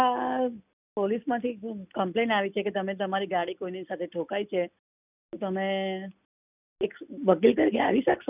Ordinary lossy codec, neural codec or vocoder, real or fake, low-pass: none; none; real; 3.6 kHz